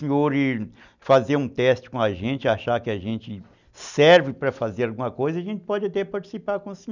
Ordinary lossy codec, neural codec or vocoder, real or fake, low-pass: none; none; real; 7.2 kHz